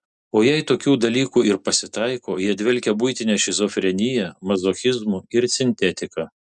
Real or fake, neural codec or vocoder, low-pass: real; none; 10.8 kHz